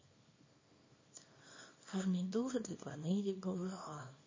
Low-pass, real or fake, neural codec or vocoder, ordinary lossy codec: 7.2 kHz; fake; codec, 24 kHz, 0.9 kbps, WavTokenizer, small release; MP3, 32 kbps